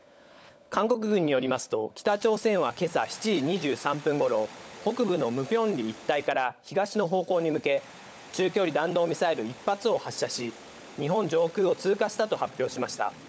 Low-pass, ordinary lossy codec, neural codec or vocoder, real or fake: none; none; codec, 16 kHz, 16 kbps, FunCodec, trained on LibriTTS, 50 frames a second; fake